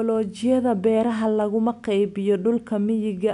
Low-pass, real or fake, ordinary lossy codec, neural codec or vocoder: 10.8 kHz; real; none; none